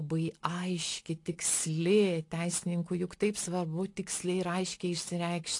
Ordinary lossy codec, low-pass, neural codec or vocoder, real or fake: AAC, 48 kbps; 10.8 kHz; none; real